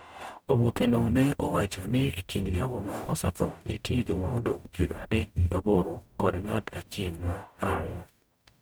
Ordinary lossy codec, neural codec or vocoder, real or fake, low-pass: none; codec, 44.1 kHz, 0.9 kbps, DAC; fake; none